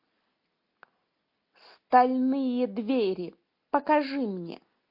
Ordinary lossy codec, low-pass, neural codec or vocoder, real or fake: MP3, 32 kbps; 5.4 kHz; none; real